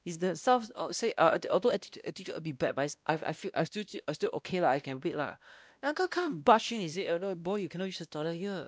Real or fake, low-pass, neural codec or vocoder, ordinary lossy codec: fake; none; codec, 16 kHz, 1 kbps, X-Codec, WavLM features, trained on Multilingual LibriSpeech; none